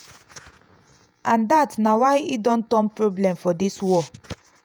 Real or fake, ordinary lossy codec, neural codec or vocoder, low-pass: fake; none; vocoder, 48 kHz, 128 mel bands, Vocos; none